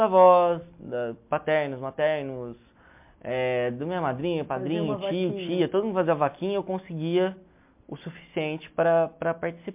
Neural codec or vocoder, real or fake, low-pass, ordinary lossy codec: none; real; 3.6 kHz; MP3, 32 kbps